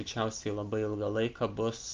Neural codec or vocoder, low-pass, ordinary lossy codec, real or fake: none; 7.2 kHz; Opus, 32 kbps; real